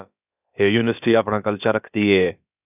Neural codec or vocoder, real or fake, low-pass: codec, 16 kHz, about 1 kbps, DyCAST, with the encoder's durations; fake; 3.6 kHz